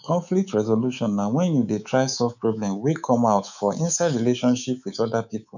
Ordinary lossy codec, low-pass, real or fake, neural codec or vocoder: none; 7.2 kHz; fake; codec, 24 kHz, 3.1 kbps, DualCodec